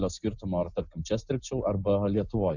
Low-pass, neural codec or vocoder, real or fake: 7.2 kHz; none; real